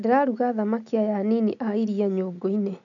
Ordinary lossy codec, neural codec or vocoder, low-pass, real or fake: none; none; 7.2 kHz; real